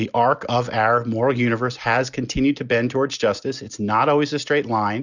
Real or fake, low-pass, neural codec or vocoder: real; 7.2 kHz; none